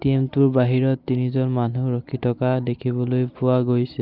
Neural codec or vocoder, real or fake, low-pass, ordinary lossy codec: none; real; 5.4 kHz; Opus, 32 kbps